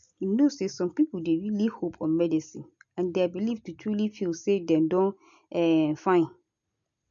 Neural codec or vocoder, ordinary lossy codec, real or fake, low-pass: none; none; real; 7.2 kHz